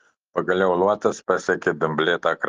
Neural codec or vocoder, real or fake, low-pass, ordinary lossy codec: none; real; 7.2 kHz; Opus, 16 kbps